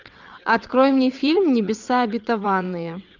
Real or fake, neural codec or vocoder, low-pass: fake; vocoder, 22.05 kHz, 80 mel bands, WaveNeXt; 7.2 kHz